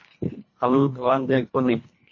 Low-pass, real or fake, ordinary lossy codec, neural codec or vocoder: 7.2 kHz; fake; MP3, 32 kbps; codec, 24 kHz, 1.5 kbps, HILCodec